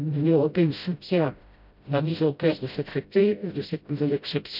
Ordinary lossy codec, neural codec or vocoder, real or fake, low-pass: none; codec, 16 kHz, 0.5 kbps, FreqCodec, smaller model; fake; 5.4 kHz